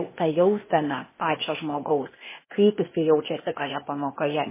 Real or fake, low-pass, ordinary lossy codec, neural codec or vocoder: fake; 3.6 kHz; MP3, 16 kbps; codec, 16 kHz, 0.8 kbps, ZipCodec